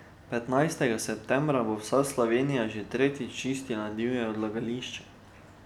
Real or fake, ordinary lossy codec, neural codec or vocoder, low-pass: real; none; none; 19.8 kHz